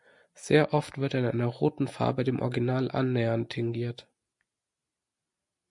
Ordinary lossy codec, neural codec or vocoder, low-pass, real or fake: MP3, 64 kbps; none; 10.8 kHz; real